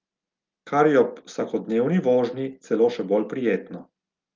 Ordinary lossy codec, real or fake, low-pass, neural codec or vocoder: Opus, 32 kbps; real; 7.2 kHz; none